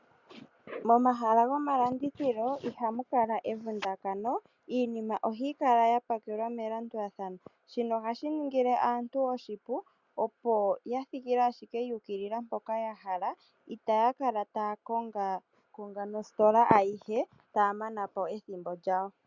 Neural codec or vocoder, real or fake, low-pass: none; real; 7.2 kHz